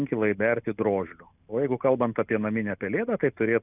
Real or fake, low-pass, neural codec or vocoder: real; 3.6 kHz; none